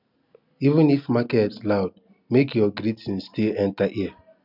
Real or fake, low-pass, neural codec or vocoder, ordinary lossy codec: real; 5.4 kHz; none; none